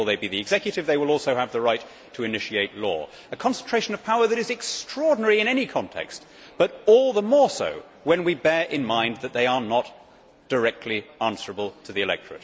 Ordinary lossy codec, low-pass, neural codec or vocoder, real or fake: none; none; none; real